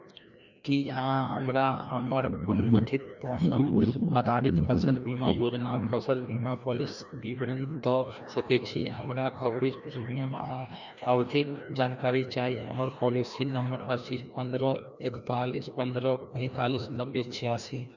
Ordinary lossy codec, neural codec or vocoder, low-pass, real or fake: none; codec, 16 kHz, 1 kbps, FreqCodec, larger model; 7.2 kHz; fake